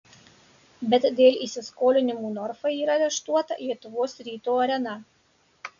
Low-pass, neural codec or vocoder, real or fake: 7.2 kHz; none; real